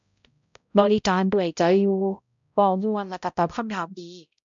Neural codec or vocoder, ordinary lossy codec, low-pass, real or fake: codec, 16 kHz, 0.5 kbps, X-Codec, HuBERT features, trained on balanced general audio; MP3, 64 kbps; 7.2 kHz; fake